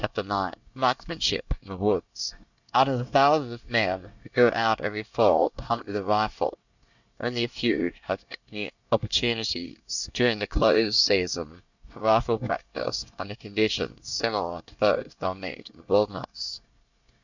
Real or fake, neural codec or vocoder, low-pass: fake; codec, 24 kHz, 1 kbps, SNAC; 7.2 kHz